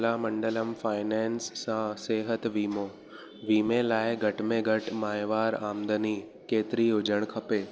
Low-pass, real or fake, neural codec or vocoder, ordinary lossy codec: none; real; none; none